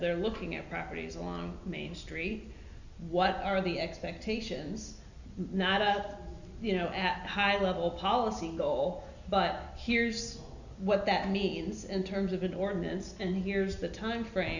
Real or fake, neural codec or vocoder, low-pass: real; none; 7.2 kHz